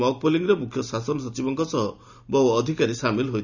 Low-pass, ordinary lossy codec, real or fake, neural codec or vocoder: 7.2 kHz; none; real; none